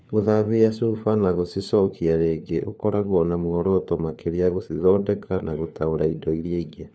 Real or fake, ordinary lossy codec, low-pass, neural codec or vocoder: fake; none; none; codec, 16 kHz, 4 kbps, FunCodec, trained on LibriTTS, 50 frames a second